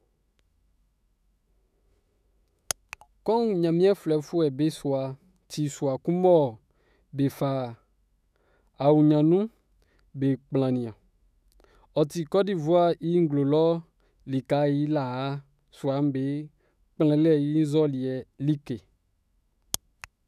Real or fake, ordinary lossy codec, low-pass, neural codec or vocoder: fake; none; 14.4 kHz; autoencoder, 48 kHz, 128 numbers a frame, DAC-VAE, trained on Japanese speech